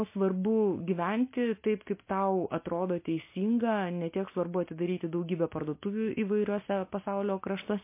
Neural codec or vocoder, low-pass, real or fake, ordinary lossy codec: none; 3.6 kHz; real; MP3, 24 kbps